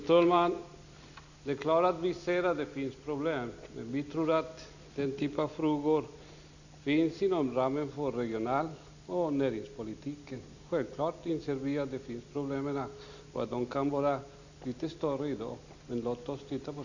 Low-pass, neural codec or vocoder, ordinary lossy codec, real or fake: 7.2 kHz; none; none; real